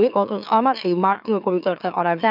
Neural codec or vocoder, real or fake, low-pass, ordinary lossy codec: autoencoder, 44.1 kHz, a latent of 192 numbers a frame, MeloTTS; fake; 5.4 kHz; none